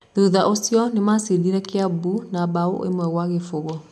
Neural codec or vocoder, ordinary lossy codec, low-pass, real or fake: none; none; none; real